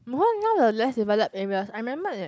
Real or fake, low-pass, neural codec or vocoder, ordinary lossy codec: real; none; none; none